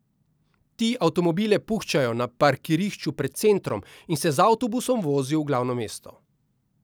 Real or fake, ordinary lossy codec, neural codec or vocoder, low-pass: real; none; none; none